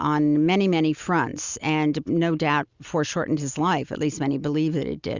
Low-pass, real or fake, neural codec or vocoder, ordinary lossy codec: 7.2 kHz; real; none; Opus, 64 kbps